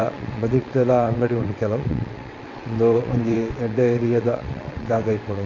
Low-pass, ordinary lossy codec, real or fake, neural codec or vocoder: 7.2 kHz; AAC, 32 kbps; fake; vocoder, 22.05 kHz, 80 mel bands, WaveNeXt